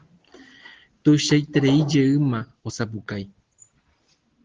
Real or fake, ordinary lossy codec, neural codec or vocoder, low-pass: real; Opus, 16 kbps; none; 7.2 kHz